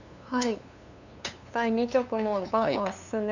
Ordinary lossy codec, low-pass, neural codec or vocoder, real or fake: none; 7.2 kHz; codec, 16 kHz, 2 kbps, FunCodec, trained on LibriTTS, 25 frames a second; fake